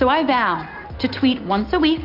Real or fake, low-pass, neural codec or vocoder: real; 5.4 kHz; none